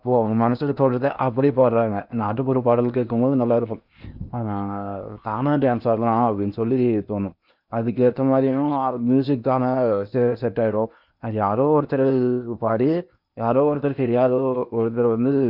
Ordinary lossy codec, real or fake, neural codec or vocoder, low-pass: none; fake; codec, 16 kHz in and 24 kHz out, 0.8 kbps, FocalCodec, streaming, 65536 codes; 5.4 kHz